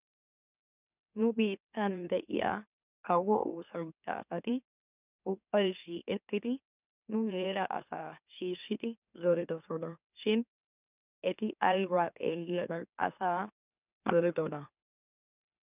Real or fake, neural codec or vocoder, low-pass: fake; autoencoder, 44.1 kHz, a latent of 192 numbers a frame, MeloTTS; 3.6 kHz